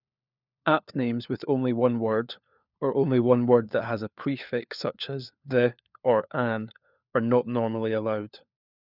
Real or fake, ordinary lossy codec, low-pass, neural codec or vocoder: fake; none; 5.4 kHz; codec, 16 kHz, 4 kbps, FunCodec, trained on LibriTTS, 50 frames a second